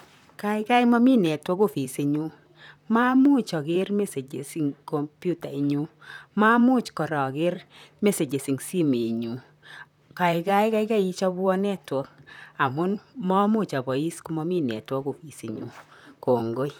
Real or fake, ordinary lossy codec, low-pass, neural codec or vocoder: fake; none; 19.8 kHz; vocoder, 44.1 kHz, 128 mel bands, Pupu-Vocoder